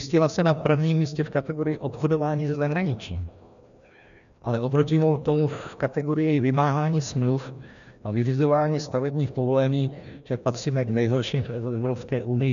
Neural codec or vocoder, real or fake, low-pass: codec, 16 kHz, 1 kbps, FreqCodec, larger model; fake; 7.2 kHz